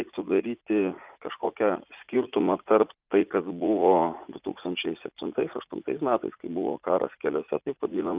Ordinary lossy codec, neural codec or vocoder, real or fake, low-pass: Opus, 32 kbps; vocoder, 44.1 kHz, 80 mel bands, Vocos; fake; 3.6 kHz